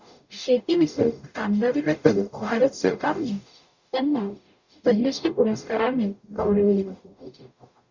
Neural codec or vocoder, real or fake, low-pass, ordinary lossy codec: codec, 44.1 kHz, 0.9 kbps, DAC; fake; 7.2 kHz; Opus, 64 kbps